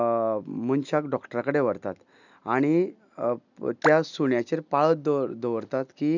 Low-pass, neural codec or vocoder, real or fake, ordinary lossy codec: 7.2 kHz; none; real; none